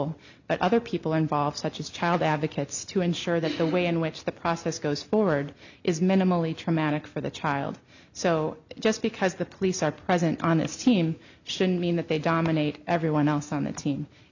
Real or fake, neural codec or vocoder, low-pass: real; none; 7.2 kHz